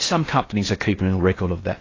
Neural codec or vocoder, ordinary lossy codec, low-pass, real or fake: codec, 16 kHz in and 24 kHz out, 0.8 kbps, FocalCodec, streaming, 65536 codes; AAC, 32 kbps; 7.2 kHz; fake